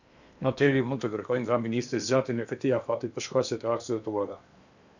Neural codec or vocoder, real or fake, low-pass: codec, 16 kHz in and 24 kHz out, 0.8 kbps, FocalCodec, streaming, 65536 codes; fake; 7.2 kHz